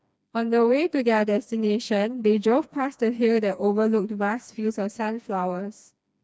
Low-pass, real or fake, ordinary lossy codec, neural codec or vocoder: none; fake; none; codec, 16 kHz, 2 kbps, FreqCodec, smaller model